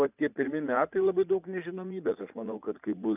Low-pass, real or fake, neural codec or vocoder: 3.6 kHz; fake; vocoder, 44.1 kHz, 80 mel bands, Vocos